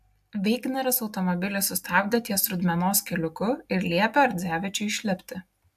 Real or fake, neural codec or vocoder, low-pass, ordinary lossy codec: real; none; 14.4 kHz; AAC, 96 kbps